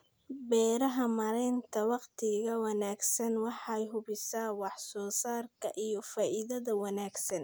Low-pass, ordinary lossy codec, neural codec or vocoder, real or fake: none; none; none; real